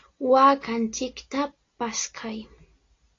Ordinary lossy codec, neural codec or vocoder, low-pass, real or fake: AAC, 32 kbps; none; 7.2 kHz; real